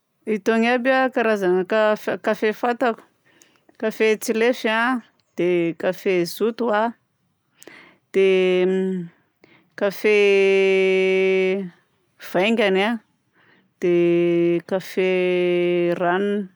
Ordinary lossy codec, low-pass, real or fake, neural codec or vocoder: none; none; real; none